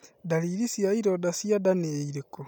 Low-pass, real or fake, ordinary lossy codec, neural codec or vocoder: none; real; none; none